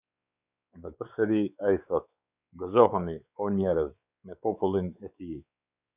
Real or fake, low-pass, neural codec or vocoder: fake; 3.6 kHz; codec, 16 kHz, 4 kbps, X-Codec, WavLM features, trained on Multilingual LibriSpeech